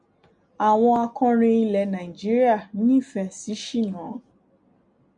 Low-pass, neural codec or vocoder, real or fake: 9.9 kHz; none; real